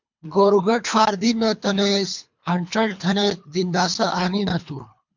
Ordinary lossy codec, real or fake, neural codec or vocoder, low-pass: MP3, 64 kbps; fake; codec, 24 kHz, 3 kbps, HILCodec; 7.2 kHz